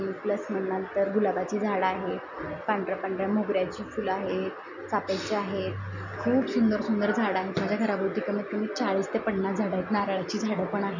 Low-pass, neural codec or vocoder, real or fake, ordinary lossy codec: 7.2 kHz; none; real; none